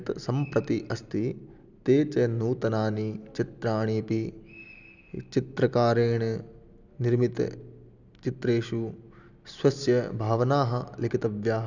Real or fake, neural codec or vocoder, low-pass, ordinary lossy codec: real; none; 7.2 kHz; none